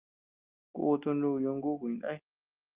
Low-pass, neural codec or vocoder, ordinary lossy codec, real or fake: 3.6 kHz; none; Opus, 32 kbps; real